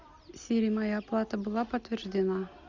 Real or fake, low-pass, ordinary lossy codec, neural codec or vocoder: real; 7.2 kHz; Opus, 64 kbps; none